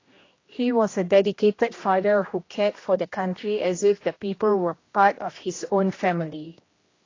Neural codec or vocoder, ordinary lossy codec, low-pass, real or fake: codec, 16 kHz, 1 kbps, X-Codec, HuBERT features, trained on general audio; AAC, 32 kbps; 7.2 kHz; fake